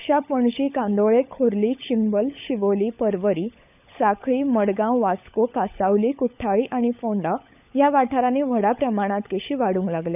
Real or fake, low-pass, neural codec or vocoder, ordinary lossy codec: fake; 3.6 kHz; codec, 16 kHz, 16 kbps, FunCodec, trained on LibriTTS, 50 frames a second; none